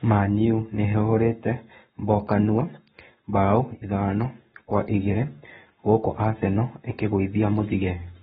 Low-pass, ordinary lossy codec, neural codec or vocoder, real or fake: 19.8 kHz; AAC, 16 kbps; codec, 44.1 kHz, 7.8 kbps, Pupu-Codec; fake